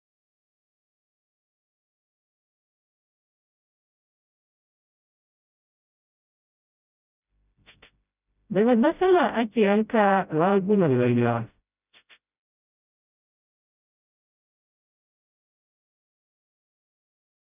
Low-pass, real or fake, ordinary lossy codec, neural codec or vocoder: 3.6 kHz; fake; none; codec, 16 kHz, 0.5 kbps, FreqCodec, smaller model